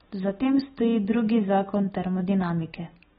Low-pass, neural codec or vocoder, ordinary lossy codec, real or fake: 19.8 kHz; none; AAC, 16 kbps; real